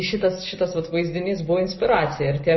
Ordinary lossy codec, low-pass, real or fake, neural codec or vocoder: MP3, 24 kbps; 7.2 kHz; real; none